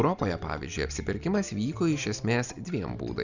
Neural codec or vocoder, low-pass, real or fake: none; 7.2 kHz; real